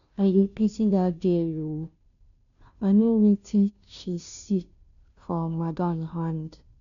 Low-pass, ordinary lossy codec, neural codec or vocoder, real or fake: 7.2 kHz; MP3, 96 kbps; codec, 16 kHz, 0.5 kbps, FunCodec, trained on Chinese and English, 25 frames a second; fake